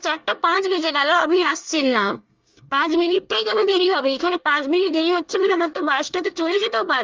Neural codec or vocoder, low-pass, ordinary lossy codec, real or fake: codec, 24 kHz, 1 kbps, SNAC; 7.2 kHz; Opus, 32 kbps; fake